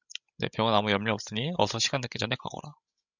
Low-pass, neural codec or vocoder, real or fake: 7.2 kHz; codec, 16 kHz, 16 kbps, FreqCodec, larger model; fake